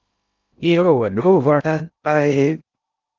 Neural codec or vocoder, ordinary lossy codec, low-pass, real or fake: codec, 16 kHz in and 24 kHz out, 0.6 kbps, FocalCodec, streaming, 2048 codes; Opus, 24 kbps; 7.2 kHz; fake